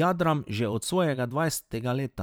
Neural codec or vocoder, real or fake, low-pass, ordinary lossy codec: vocoder, 44.1 kHz, 128 mel bands every 512 samples, BigVGAN v2; fake; none; none